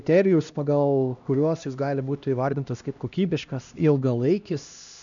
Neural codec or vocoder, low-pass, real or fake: codec, 16 kHz, 1 kbps, X-Codec, HuBERT features, trained on LibriSpeech; 7.2 kHz; fake